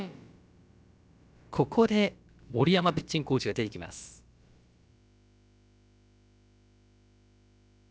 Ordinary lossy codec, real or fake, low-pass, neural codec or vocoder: none; fake; none; codec, 16 kHz, about 1 kbps, DyCAST, with the encoder's durations